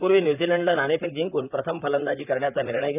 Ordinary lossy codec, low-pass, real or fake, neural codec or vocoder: none; 3.6 kHz; fake; vocoder, 44.1 kHz, 128 mel bands, Pupu-Vocoder